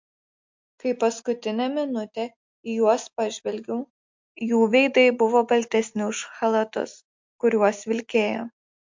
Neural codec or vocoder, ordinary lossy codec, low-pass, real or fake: none; MP3, 48 kbps; 7.2 kHz; real